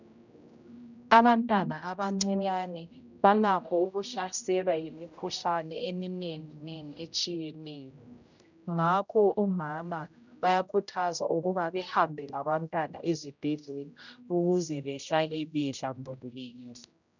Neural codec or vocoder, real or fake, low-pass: codec, 16 kHz, 0.5 kbps, X-Codec, HuBERT features, trained on general audio; fake; 7.2 kHz